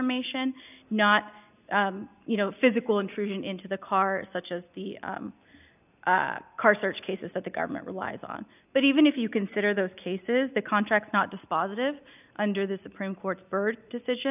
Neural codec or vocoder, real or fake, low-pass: none; real; 3.6 kHz